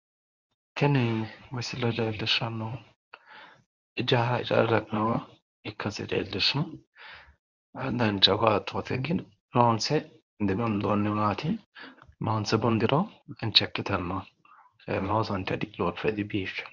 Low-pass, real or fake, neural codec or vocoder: 7.2 kHz; fake; codec, 24 kHz, 0.9 kbps, WavTokenizer, medium speech release version 1